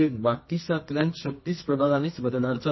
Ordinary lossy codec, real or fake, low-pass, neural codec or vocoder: MP3, 24 kbps; fake; 7.2 kHz; codec, 24 kHz, 0.9 kbps, WavTokenizer, medium music audio release